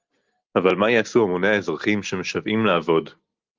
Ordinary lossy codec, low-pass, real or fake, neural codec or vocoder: Opus, 32 kbps; 7.2 kHz; real; none